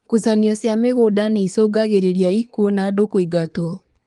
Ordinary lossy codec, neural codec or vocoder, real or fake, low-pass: Opus, 32 kbps; codec, 24 kHz, 1 kbps, SNAC; fake; 10.8 kHz